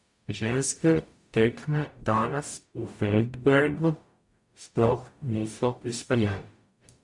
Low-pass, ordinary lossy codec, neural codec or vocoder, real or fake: 10.8 kHz; MP3, 64 kbps; codec, 44.1 kHz, 0.9 kbps, DAC; fake